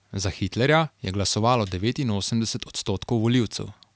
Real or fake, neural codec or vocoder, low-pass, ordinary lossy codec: real; none; none; none